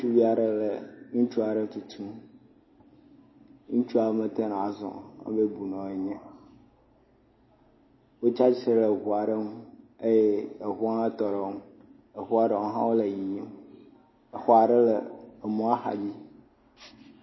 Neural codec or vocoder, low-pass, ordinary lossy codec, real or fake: none; 7.2 kHz; MP3, 24 kbps; real